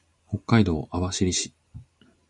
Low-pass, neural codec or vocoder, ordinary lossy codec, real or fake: 10.8 kHz; none; MP3, 96 kbps; real